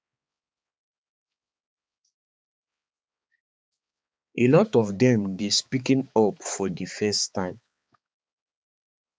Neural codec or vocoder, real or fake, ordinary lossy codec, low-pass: codec, 16 kHz, 4 kbps, X-Codec, HuBERT features, trained on balanced general audio; fake; none; none